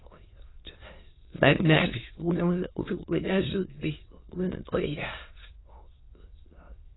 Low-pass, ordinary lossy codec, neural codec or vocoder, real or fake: 7.2 kHz; AAC, 16 kbps; autoencoder, 22.05 kHz, a latent of 192 numbers a frame, VITS, trained on many speakers; fake